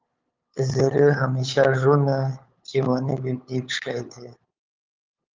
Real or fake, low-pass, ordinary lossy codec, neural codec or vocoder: fake; 7.2 kHz; Opus, 24 kbps; codec, 16 kHz, 8 kbps, FunCodec, trained on LibriTTS, 25 frames a second